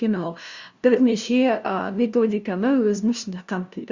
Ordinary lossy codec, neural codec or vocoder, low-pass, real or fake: Opus, 64 kbps; codec, 16 kHz, 0.5 kbps, FunCodec, trained on LibriTTS, 25 frames a second; 7.2 kHz; fake